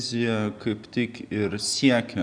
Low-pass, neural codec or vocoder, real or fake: 9.9 kHz; none; real